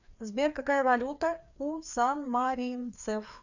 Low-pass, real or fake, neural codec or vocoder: 7.2 kHz; fake; codec, 16 kHz, 2 kbps, FreqCodec, larger model